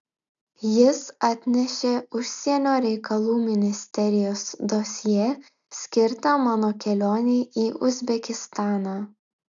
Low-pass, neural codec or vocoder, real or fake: 7.2 kHz; none; real